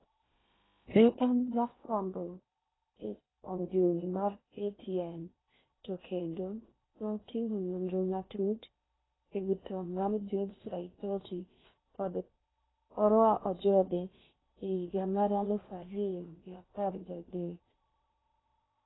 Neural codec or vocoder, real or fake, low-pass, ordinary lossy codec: codec, 16 kHz in and 24 kHz out, 0.8 kbps, FocalCodec, streaming, 65536 codes; fake; 7.2 kHz; AAC, 16 kbps